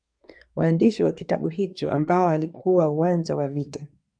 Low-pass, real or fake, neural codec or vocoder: 9.9 kHz; fake; codec, 24 kHz, 1 kbps, SNAC